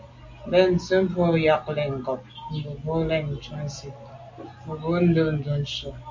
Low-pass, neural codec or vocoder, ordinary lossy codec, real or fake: 7.2 kHz; none; MP3, 48 kbps; real